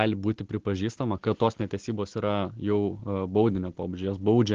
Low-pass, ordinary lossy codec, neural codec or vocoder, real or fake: 7.2 kHz; Opus, 16 kbps; none; real